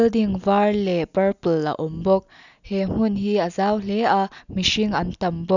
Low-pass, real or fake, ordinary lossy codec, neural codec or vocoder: 7.2 kHz; real; none; none